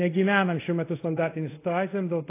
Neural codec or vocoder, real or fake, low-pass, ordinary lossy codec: codec, 24 kHz, 0.5 kbps, DualCodec; fake; 3.6 kHz; AAC, 24 kbps